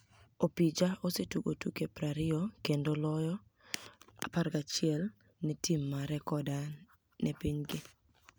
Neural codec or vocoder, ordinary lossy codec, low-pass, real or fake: none; none; none; real